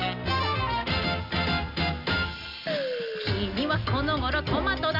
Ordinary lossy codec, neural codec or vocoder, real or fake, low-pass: none; none; real; 5.4 kHz